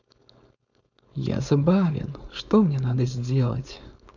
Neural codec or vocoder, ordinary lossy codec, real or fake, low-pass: codec, 16 kHz, 4.8 kbps, FACodec; none; fake; 7.2 kHz